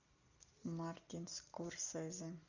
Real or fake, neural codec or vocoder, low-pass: real; none; 7.2 kHz